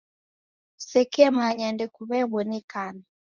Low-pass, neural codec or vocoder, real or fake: 7.2 kHz; codec, 24 kHz, 0.9 kbps, WavTokenizer, medium speech release version 2; fake